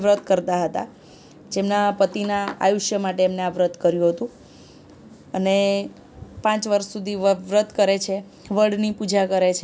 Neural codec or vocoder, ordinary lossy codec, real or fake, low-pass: none; none; real; none